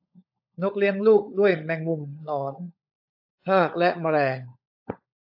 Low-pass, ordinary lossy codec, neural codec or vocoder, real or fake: 5.4 kHz; AAC, 48 kbps; codec, 16 kHz, 16 kbps, FunCodec, trained on LibriTTS, 50 frames a second; fake